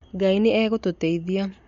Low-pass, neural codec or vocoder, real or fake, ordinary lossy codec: 7.2 kHz; none; real; MP3, 48 kbps